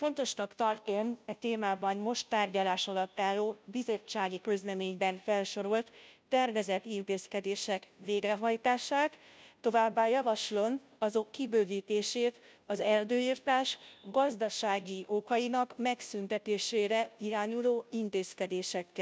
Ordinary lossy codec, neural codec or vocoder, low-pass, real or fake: none; codec, 16 kHz, 0.5 kbps, FunCodec, trained on Chinese and English, 25 frames a second; none; fake